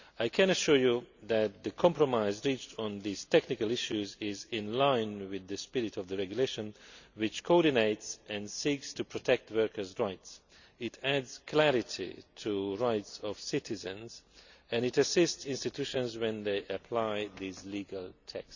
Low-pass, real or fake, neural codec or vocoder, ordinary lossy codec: 7.2 kHz; real; none; none